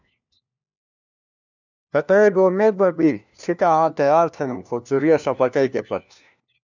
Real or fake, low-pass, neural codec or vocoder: fake; 7.2 kHz; codec, 16 kHz, 1 kbps, FunCodec, trained on LibriTTS, 50 frames a second